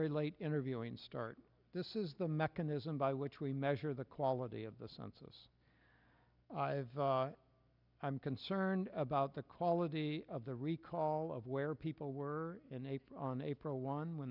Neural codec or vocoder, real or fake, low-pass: none; real; 5.4 kHz